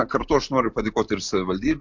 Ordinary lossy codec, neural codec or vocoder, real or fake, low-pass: MP3, 64 kbps; none; real; 7.2 kHz